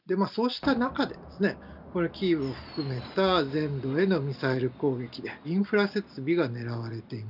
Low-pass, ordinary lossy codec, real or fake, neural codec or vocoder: 5.4 kHz; none; real; none